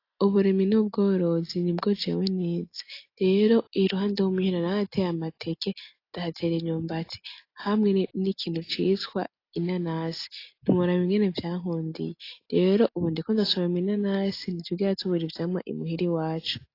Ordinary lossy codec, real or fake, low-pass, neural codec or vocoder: AAC, 32 kbps; real; 5.4 kHz; none